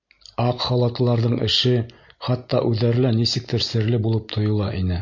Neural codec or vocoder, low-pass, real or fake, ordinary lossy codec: none; 7.2 kHz; real; MP3, 48 kbps